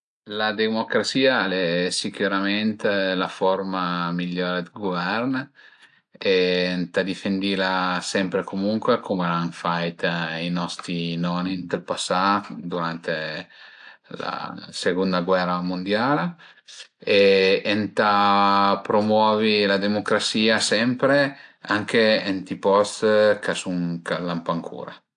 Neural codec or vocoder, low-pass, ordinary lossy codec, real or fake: none; 9.9 kHz; AAC, 64 kbps; real